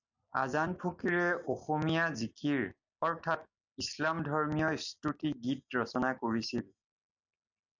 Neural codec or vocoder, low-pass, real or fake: none; 7.2 kHz; real